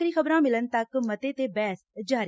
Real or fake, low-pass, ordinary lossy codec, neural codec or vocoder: real; none; none; none